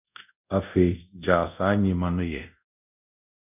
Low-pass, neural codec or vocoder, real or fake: 3.6 kHz; codec, 24 kHz, 0.5 kbps, DualCodec; fake